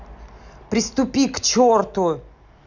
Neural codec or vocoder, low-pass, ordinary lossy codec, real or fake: none; 7.2 kHz; none; real